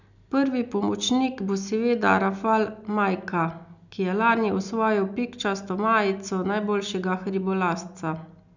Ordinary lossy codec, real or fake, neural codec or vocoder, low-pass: none; real; none; 7.2 kHz